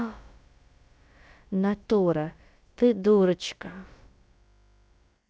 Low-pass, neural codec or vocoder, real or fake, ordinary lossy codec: none; codec, 16 kHz, about 1 kbps, DyCAST, with the encoder's durations; fake; none